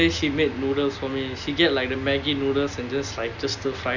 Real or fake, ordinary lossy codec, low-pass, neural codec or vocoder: real; none; 7.2 kHz; none